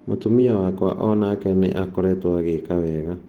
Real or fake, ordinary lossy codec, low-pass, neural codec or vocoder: fake; Opus, 16 kbps; 19.8 kHz; vocoder, 48 kHz, 128 mel bands, Vocos